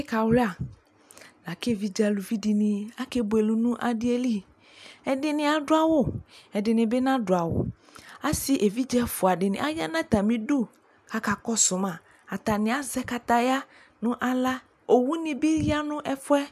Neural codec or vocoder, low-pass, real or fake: none; 14.4 kHz; real